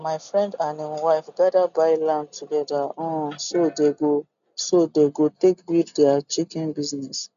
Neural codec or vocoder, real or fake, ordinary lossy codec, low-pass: none; real; none; 7.2 kHz